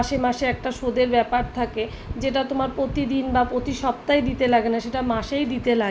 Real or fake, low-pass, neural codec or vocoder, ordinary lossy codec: real; none; none; none